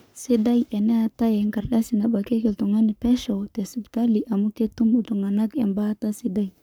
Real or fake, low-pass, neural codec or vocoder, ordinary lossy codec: fake; none; codec, 44.1 kHz, 7.8 kbps, Pupu-Codec; none